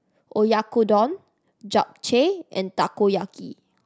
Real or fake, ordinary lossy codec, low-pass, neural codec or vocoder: real; none; none; none